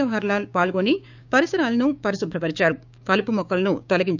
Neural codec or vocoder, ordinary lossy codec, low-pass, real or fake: codec, 16 kHz, 6 kbps, DAC; none; 7.2 kHz; fake